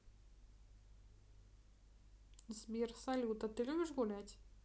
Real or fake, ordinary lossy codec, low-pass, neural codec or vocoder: real; none; none; none